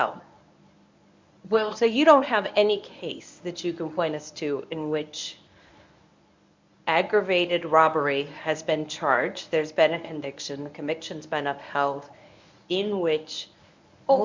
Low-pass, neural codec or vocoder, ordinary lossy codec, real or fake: 7.2 kHz; codec, 24 kHz, 0.9 kbps, WavTokenizer, medium speech release version 1; MP3, 64 kbps; fake